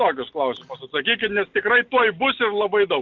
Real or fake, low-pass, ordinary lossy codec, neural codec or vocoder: real; 7.2 kHz; Opus, 24 kbps; none